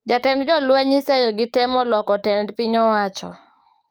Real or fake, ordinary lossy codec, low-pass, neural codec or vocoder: fake; none; none; codec, 44.1 kHz, 7.8 kbps, DAC